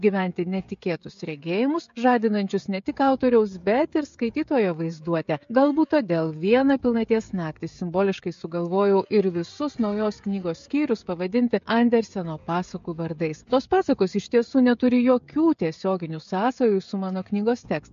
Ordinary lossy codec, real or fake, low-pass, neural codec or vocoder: MP3, 48 kbps; fake; 7.2 kHz; codec, 16 kHz, 16 kbps, FreqCodec, smaller model